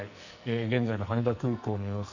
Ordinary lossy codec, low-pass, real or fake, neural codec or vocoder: none; 7.2 kHz; fake; codec, 44.1 kHz, 2.6 kbps, SNAC